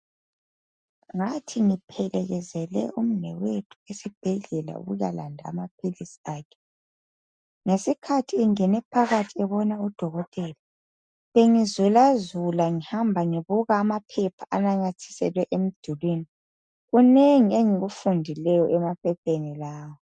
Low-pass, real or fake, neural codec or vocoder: 9.9 kHz; real; none